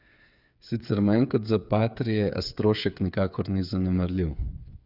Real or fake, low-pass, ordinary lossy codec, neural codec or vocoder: fake; 5.4 kHz; none; codec, 16 kHz, 8 kbps, FreqCodec, smaller model